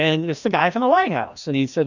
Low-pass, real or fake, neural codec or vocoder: 7.2 kHz; fake; codec, 16 kHz, 1 kbps, FreqCodec, larger model